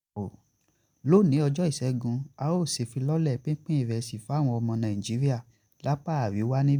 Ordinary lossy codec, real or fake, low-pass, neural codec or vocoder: none; real; 19.8 kHz; none